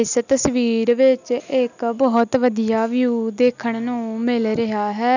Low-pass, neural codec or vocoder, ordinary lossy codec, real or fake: 7.2 kHz; none; none; real